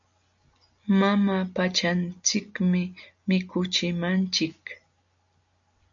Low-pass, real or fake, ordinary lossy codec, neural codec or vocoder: 7.2 kHz; real; MP3, 96 kbps; none